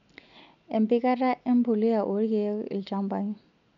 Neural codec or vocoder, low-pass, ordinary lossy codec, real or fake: none; 7.2 kHz; none; real